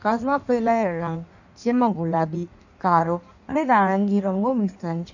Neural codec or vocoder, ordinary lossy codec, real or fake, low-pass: codec, 16 kHz in and 24 kHz out, 1.1 kbps, FireRedTTS-2 codec; none; fake; 7.2 kHz